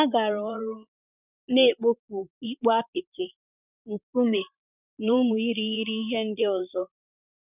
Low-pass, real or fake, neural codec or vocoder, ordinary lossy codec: 3.6 kHz; fake; vocoder, 44.1 kHz, 128 mel bands, Pupu-Vocoder; none